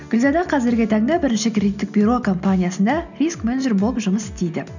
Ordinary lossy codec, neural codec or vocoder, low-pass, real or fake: none; none; 7.2 kHz; real